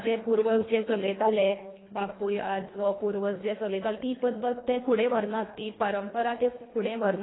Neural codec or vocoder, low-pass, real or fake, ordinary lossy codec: codec, 24 kHz, 1.5 kbps, HILCodec; 7.2 kHz; fake; AAC, 16 kbps